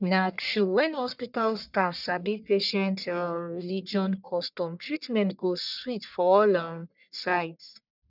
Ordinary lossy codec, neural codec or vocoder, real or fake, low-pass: none; codec, 44.1 kHz, 1.7 kbps, Pupu-Codec; fake; 5.4 kHz